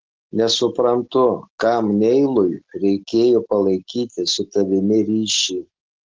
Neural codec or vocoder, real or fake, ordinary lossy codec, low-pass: none; real; Opus, 16 kbps; 7.2 kHz